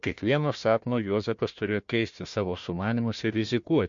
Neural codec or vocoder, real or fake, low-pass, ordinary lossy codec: codec, 16 kHz, 1 kbps, FunCodec, trained on Chinese and English, 50 frames a second; fake; 7.2 kHz; MP3, 48 kbps